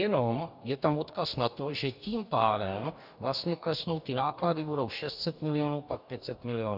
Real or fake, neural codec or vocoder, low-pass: fake; codec, 44.1 kHz, 2.6 kbps, DAC; 5.4 kHz